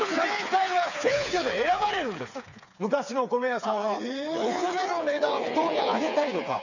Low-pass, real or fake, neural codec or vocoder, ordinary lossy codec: 7.2 kHz; fake; codec, 16 kHz, 4 kbps, FreqCodec, smaller model; none